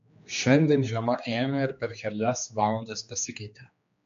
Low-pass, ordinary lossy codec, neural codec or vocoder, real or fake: 7.2 kHz; MP3, 48 kbps; codec, 16 kHz, 2 kbps, X-Codec, HuBERT features, trained on general audio; fake